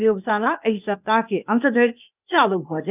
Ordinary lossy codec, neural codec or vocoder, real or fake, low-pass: none; codec, 16 kHz, 0.8 kbps, ZipCodec; fake; 3.6 kHz